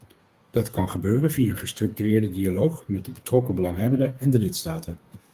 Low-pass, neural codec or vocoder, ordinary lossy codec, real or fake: 14.4 kHz; codec, 32 kHz, 1.9 kbps, SNAC; Opus, 32 kbps; fake